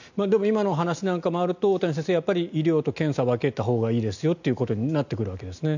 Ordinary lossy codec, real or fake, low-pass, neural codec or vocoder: none; real; 7.2 kHz; none